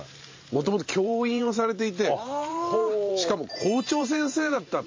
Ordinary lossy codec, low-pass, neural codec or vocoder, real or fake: MP3, 32 kbps; 7.2 kHz; vocoder, 44.1 kHz, 128 mel bands every 512 samples, BigVGAN v2; fake